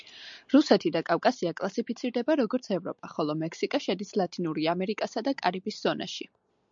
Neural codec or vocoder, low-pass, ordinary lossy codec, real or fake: none; 7.2 kHz; MP3, 64 kbps; real